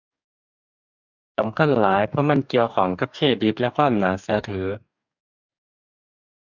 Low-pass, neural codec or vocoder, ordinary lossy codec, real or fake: 7.2 kHz; codec, 44.1 kHz, 2.6 kbps, DAC; none; fake